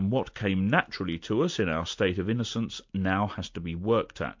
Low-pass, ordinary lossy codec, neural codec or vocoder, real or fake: 7.2 kHz; MP3, 48 kbps; none; real